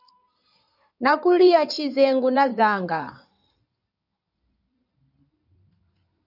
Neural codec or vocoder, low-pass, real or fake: codec, 16 kHz in and 24 kHz out, 2.2 kbps, FireRedTTS-2 codec; 5.4 kHz; fake